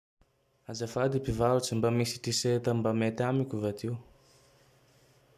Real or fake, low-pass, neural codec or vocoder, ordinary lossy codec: real; 14.4 kHz; none; none